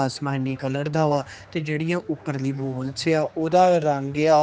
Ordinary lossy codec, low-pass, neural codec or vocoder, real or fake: none; none; codec, 16 kHz, 2 kbps, X-Codec, HuBERT features, trained on general audio; fake